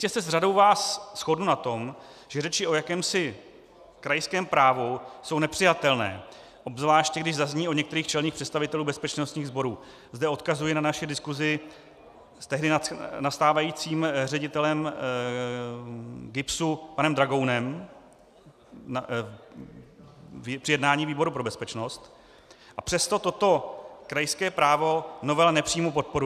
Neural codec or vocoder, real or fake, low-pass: none; real; 14.4 kHz